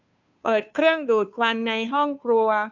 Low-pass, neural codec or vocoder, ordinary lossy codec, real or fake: 7.2 kHz; codec, 16 kHz, 2 kbps, FunCodec, trained on Chinese and English, 25 frames a second; none; fake